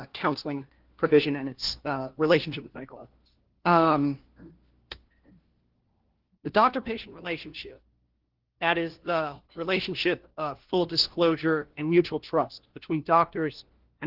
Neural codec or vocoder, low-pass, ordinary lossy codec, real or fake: codec, 16 kHz, 1 kbps, FunCodec, trained on LibriTTS, 50 frames a second; 5.4 kHz; Opus, 16 kbps; fake